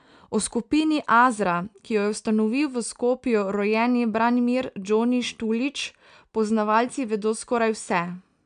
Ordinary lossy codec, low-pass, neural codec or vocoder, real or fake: MP3, 96 kbps; 9.9 kHz; none; real